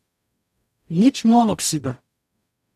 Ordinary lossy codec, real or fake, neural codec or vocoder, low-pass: none; fake; codec, 44.1 kHz, 0.9 kbps, DAC; 14.4 kHz